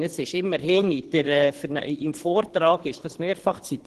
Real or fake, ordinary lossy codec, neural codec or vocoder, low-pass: fake; Opus, 16 kbps; codec, 24 kHz, 3 kbps, HILCodec; 10.8 kHz